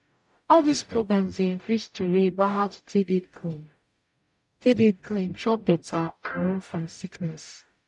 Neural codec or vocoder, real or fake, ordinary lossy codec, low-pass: codec, 44.1 kHz, 0.9 kbps, DAC; fake; none; 10.8 kHz